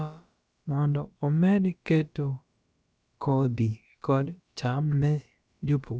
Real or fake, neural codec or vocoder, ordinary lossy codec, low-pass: fake; codec, 16 kHz, about 1 kbps, DyCAST, with the encoder's durations; none; none